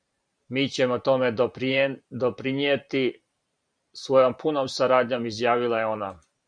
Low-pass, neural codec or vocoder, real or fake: 9.9 kHz; vocoder, 44.1 kHz, 128 mel bands every 512 samples, BigVGAN v2; fake